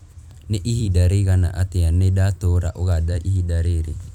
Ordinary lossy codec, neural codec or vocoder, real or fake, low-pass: none; vocoder, 44.1 kHz, 128 mel bands every 256 samples, BigVGAN v2; fake; 19.8 kHz